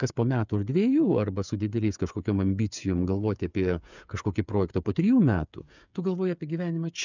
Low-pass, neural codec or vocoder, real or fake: 7.2 kHz; codec, 16 kHz, 8 kbps, FreqCodec, smaller model; fake